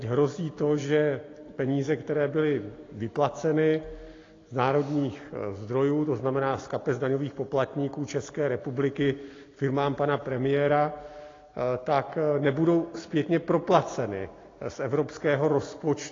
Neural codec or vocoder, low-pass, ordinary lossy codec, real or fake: none; 7.2 kHz; AAC, 32 kbps; real